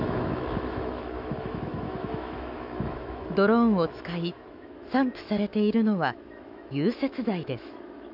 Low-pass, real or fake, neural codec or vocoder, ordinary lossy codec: 5.4 kHz; fake; autoencoder, 48 kHz, 128 numbers a frame, DAC-VAE, trained on Japanese speech; AAC, 48 kbps